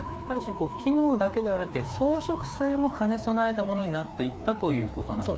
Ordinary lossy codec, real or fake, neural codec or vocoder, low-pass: none; fake; codec, 16 kHz, 2 kbps, FreqCodec, larger model; none